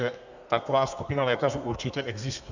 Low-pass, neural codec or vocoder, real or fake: 7.2 kHz; codec, 32 kHz, 1.9 kbps, SNAC; fake